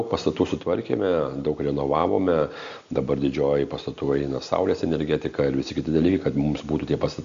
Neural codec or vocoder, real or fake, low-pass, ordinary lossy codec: none; real; 7.2 kHz; AAC, 64 kbps